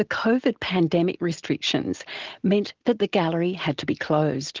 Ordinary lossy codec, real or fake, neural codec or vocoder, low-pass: Opus, 16 kbps; real; none; 7.2 kHz